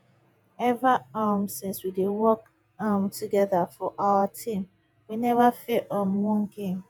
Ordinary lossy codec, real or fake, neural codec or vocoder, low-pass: none; fake; vocoder, 48 kHz, 128 mel bands, Vocos; none